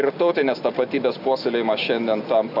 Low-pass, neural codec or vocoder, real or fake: 5.4 kHz; none; real